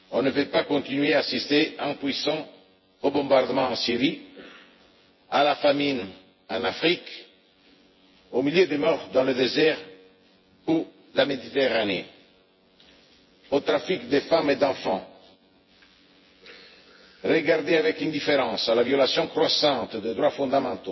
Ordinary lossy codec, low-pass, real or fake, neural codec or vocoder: MP3, 24 kbps; 7.2 kHz; fake; vocoder, 24 kHz, 100 mel bands, Vocos